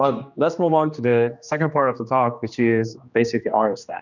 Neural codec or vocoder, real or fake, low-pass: codec, 16 kHz, 2 kbps, X-Codec, HuBERT features, trained on general audio; fake; 7.2 kHz